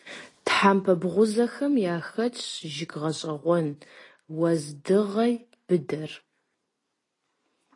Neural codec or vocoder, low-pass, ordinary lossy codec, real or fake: none; 10.8 kHz; AAC, 32 kbps; real